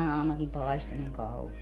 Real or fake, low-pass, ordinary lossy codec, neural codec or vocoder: fake; 19.8 kHz; Opus, 32 kbps; codec, 44.1 kHz, 7.8 kbps, DAC